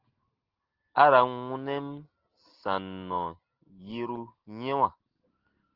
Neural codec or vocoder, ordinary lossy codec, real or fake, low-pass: none; Opus, 16 kbps; real; 5.4 kHz